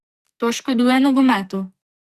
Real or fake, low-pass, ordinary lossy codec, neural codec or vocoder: fake; 14.4 kHz; Opus, 64 kbps; codec, 44.1 kHz, 2.6 kbps, SNAC